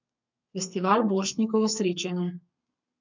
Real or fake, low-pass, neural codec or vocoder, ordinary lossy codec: fake; 7.2 kHz; codec, 32 kHz, 1.9 kbps, SNAC; MP3, 64 kbps